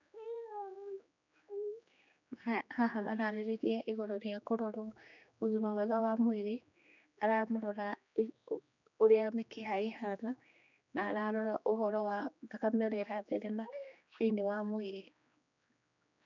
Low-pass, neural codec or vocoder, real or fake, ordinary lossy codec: 7.2 kHz; codec, 16 kHz, 2 kbps, X-Codec, HuBERT features, trained on general audio; fake; none